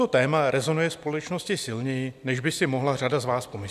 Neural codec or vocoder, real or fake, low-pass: none; real; 14.4 kHz